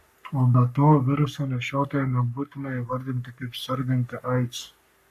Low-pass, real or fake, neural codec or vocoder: 14.4 kHz; fake; codec, 44.1 kHz, 3.4 kbps, Pupu-Codec